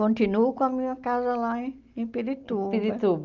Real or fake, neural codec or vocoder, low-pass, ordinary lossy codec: real; none; 7.2 kHz; Opus, 24 kbps